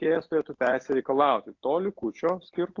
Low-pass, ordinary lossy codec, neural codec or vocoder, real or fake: 7.2 kHz; AAC, 48 kbps; none; real